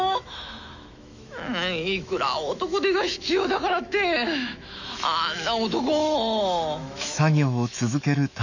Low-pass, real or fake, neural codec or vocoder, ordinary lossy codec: 7.2 kHz; fake; autoencoder, 48 kHz, 128 numbers a frame, DAC-VAE, trained on Japanese speech; none